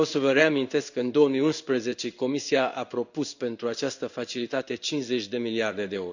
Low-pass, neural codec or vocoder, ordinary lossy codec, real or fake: 7.2 kHz; codec, 16 kHz in and 24 kHz out, 1 kbps, XY-Tokenizer; none; fake